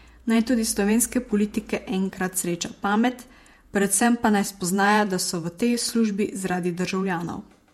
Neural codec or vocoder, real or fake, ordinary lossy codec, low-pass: vocoder, 48 kHz, 128 mel bands, Vocos; fake; MP3, 64 kbps; 19.8 kHz